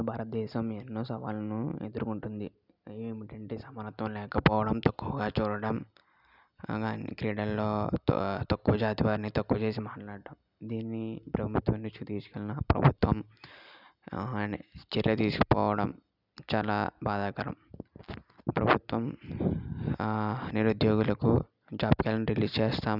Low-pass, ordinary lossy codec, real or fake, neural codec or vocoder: 5.4 kHz; none; real; none